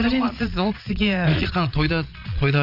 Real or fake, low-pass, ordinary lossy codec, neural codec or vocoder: fake; 5.4 kHz; none; vocoder, 22.05 kHz, 80 mel bands, Vocos